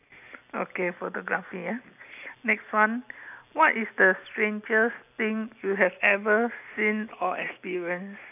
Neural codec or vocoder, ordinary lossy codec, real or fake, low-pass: none; none; real; 3.6 kHz